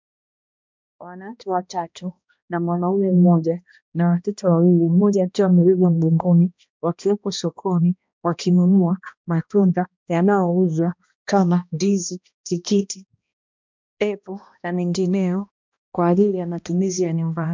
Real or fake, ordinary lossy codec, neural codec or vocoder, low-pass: fake; MP3, 64 kbps; codec, 16 kHz, 1 kbps, X-Codec, HuBERT features, trained on balanced general audio; 7.2 kHz